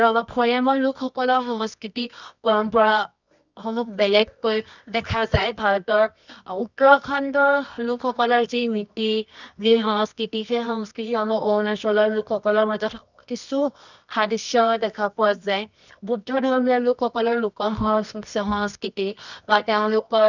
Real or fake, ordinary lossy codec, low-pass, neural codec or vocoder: fake; none; 7.2 kHz; codec, 24 kHz, 0.9 kbps, WavTokenizer, medium music audio release